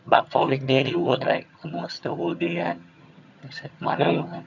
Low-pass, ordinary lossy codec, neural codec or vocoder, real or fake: 7.2 kHz; none; vocoder, 22.05 kHz, 80 mel bands, HiFi-GAN; fake